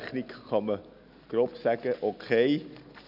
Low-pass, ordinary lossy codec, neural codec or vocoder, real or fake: 5.4 kHz; none; none; real